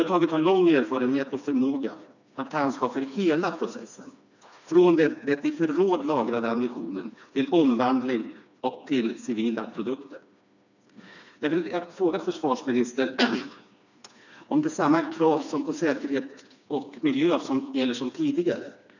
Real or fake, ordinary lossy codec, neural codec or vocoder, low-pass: fake; none; codec, 16 kHz, 2 kbps, FreqCodec, smaller model; 7.2 kHz